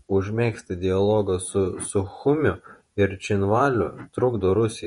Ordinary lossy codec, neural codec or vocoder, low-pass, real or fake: MP3, 48 kbps; vocoder, 48 kHz, 128 mel bands, Vocos; 19.8 kHz; fake